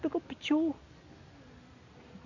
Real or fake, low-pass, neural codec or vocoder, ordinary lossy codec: real; 7.2 kHz; none; none